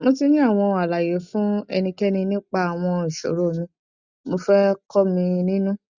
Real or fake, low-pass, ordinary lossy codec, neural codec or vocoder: real; 7.2 kHz; Opus, 64 kbps; none